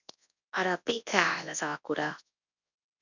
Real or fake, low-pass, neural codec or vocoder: fake; 7.2 kHz; codec, 24 kHz, 0.9 kbps, WavTokenizer, large speech release